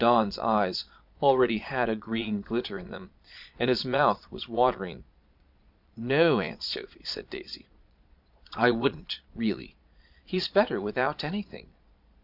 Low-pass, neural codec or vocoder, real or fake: 5.4 kHz; vocoder, 22.05 kHz, 80 mel bands, Vocos; fake